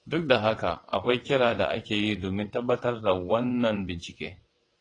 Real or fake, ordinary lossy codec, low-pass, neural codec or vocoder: fake; AAC, 32 kbps; 9.9 kHz; vocoder, 22.05 kHz, 80 mel bands, WaveNeXt